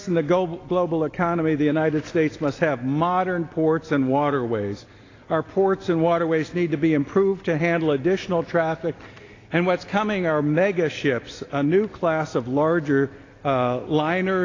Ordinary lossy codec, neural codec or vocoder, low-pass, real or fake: AAC, 32 kbps; none; 7.2 kHz; real